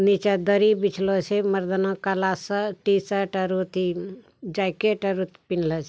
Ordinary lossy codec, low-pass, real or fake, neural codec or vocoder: none; none; real; none